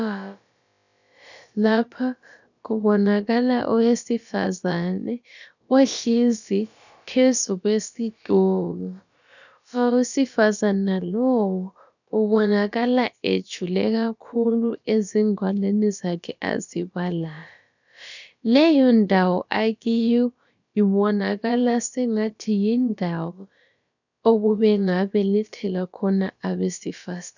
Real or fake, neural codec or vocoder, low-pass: fake; codec, 16 kHz, about 1 kbps, DyCAST, with the encoder's durations; 7.2 kHz